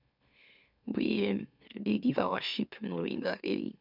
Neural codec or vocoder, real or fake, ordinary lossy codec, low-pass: autoencoder, 44.1 kHz, a latent of 192 numbers a frame, MeloTTS; fake; none; 5.4 kHz